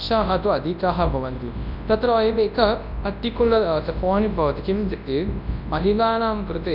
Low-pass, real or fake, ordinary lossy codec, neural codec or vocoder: 5.4 kHz; fake; none; codec, 24 kHz, 0.9 kbps, WavTokenizer, large speech release